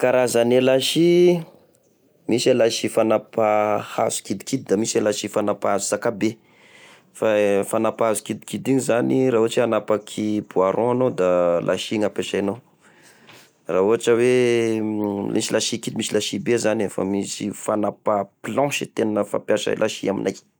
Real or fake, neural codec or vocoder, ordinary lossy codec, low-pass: real; none; none; none